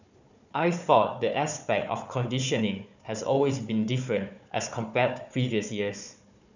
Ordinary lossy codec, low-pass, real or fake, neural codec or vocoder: none; 7.2 kHz; fake; codec, 16 kHz, 4 kbps, FunCodec, trained on Chinese and English, 50 frames a second